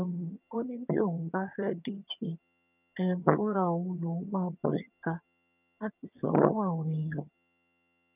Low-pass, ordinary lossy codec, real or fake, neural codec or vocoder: 3.6 kHz; none; fake; vocoder, 22.05 kHz, 80 mel bands, HiFi-GAN